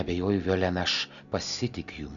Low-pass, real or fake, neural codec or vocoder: 7.2 kHz; real; none